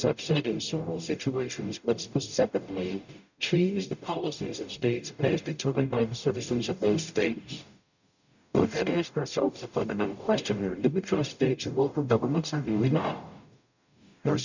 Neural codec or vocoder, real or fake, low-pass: codec, 44.1 kHz, 0.9 kbps, DAC; fake; 7.2 kHz